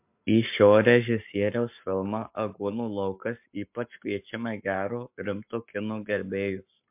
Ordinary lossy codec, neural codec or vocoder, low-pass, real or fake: MP3, 32 kbps; codec, 44.1 kHz, 7.8 kbps, Pupu-Codec; 3.6 kHz; fake